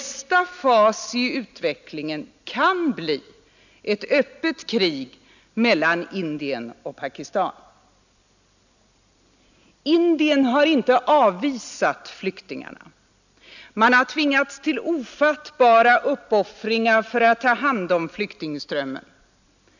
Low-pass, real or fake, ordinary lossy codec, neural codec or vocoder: 7.2 kHz; real; none; none